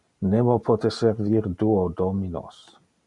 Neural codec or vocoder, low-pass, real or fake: none; 10.8 kHz; real